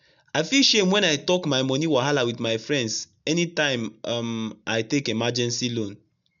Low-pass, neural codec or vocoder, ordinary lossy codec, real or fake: 7.2 kHz; none; none; real